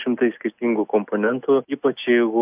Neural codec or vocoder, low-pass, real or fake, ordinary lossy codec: none; 3.6 kHz; real; AAC, 32 kbps